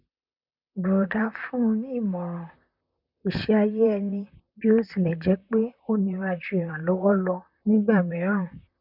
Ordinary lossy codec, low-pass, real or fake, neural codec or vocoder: none; 5.4 kHz; fake; vocoder, 44.1 kHz, 128 mel bands, Pupu-Vocoder